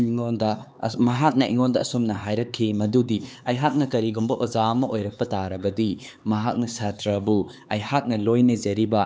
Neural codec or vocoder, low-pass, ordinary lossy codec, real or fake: codec, 16 kHz, 4 kbps, X-Codec, HuBERT features, trained on LibriSpeech; none; none; fake